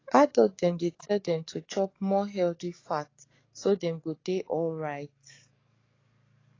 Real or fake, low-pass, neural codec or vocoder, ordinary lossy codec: fake; 7.2 kHz; codec, 44.1 kHz, 7.8 kbps, DAC; AAC, 32 kbps